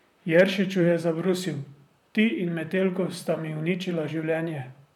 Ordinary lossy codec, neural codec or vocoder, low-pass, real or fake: none; vocoder, 44.1 kHz, 128 mel bands, Pupu-Vocoder; 19.8 kHz; fake